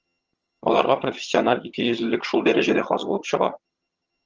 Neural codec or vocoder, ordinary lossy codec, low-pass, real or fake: vocoder, 22.05 kHz, 80 mel bands, HiFi-GAN; Opus, 24 kbps; 7.2 kHz; fake